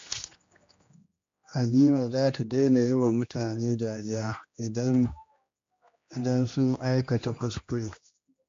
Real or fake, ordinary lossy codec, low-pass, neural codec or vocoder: fake; AAC, 48 kbps; 7.2 kHz; codec, 16 kHz, 1 kbps, X-Codec, HuBERT features, trained on general audio